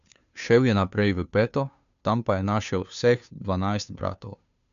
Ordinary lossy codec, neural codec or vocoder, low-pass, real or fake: none; codec, 16 kHz, 2 kbps, FunCodec, trained on Chinese and English, 25 frames a second; 7.2 kHz; fake